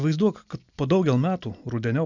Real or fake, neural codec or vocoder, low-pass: real; none; 7.2 kHz